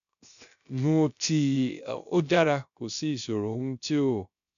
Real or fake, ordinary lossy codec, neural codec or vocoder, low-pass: fake; none; codec, 16 kHz, 0.3 kbps, FocalCodec; 7.2 kHz